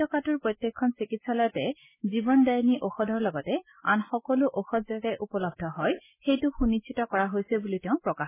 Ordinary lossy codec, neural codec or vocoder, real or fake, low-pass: MP3, 16 kbps; none; real; 3.6 kHz